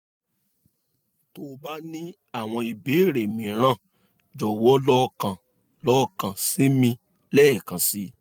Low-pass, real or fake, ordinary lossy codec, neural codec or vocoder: none; real; none; none